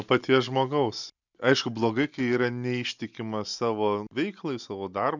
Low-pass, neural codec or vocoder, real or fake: 7.2 kHz; none; real